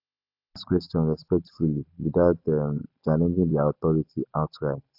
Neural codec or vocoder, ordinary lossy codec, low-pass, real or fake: none; AAC, 48 kbps; 5.4 kHz; real